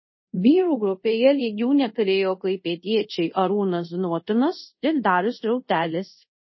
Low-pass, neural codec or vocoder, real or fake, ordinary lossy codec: 7.2 kHz; codec, 24 kHz, 0.5 kbps, DualCodec; fake; MP3, 24 kbps